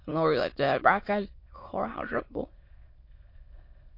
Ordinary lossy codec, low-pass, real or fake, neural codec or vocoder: MP3, 32 kbps; 5.4 kHz; fake; autoencoder, 22.05 kHz, a latent of 192 numbers a frame, VITS, trained on many speakers